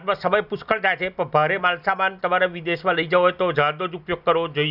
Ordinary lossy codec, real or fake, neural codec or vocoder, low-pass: none; real; none; 5.4 kHz